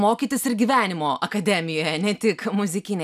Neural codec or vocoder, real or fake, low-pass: none; real; 14.4 kHz